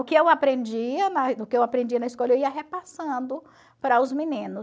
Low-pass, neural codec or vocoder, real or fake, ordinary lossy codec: none; none; real; none